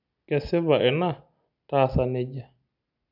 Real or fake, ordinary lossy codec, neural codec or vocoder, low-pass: real; none; none; 5.4 kHz